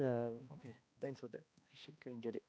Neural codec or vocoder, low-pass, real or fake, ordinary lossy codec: codec, 16 kHz, 1 kbps, X-Codec, HuBERT features, trained on balanced general audio; none; fake; none